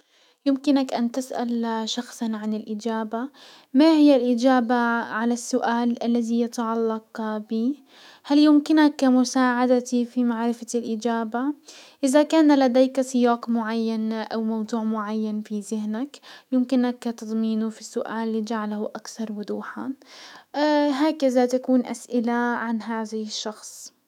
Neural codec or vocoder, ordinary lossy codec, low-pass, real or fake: autoencoder, 48 kHz, 128 numbers a frame, DAC-VAE, trained on Japanese speech; none; 19.8 kHz; fake